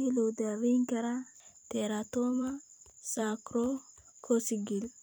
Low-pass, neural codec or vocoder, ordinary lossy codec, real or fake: none; vocoder, 44.1 kHz, 128 mel bands every 256 samples, BigVGAN v2; none; fake